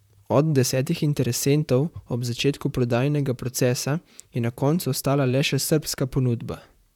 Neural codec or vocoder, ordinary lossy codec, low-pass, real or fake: vocoder, 44.1 kHz, 128 mel bands, Pupu-Vocoder; none; 19.8 kHz; fake